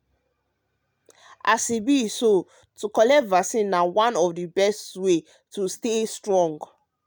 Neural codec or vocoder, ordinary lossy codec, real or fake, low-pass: none; none; real; none